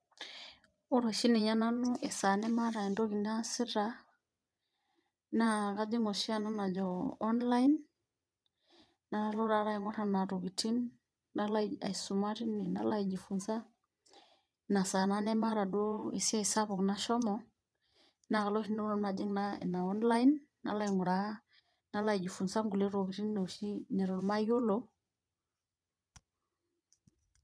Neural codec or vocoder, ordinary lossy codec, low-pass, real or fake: vocoder, 22.05 kHz, 80 mel bands, Vocos; none; none; fake